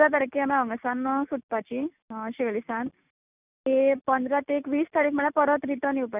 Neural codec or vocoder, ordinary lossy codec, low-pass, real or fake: none; none; 3.6 kHz; real